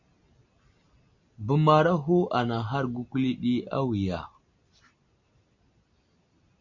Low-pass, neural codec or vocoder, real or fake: 7.2 kHz; none; real